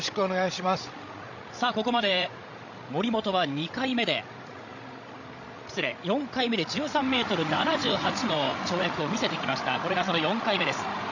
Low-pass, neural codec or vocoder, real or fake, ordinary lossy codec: 7.2 kHz; codec, 16 kHz, 16 kbps, FreqCodec, larger model; fake; none